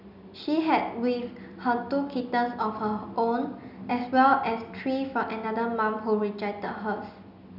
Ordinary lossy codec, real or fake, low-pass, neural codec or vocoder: none; real; 5.4 kHz; none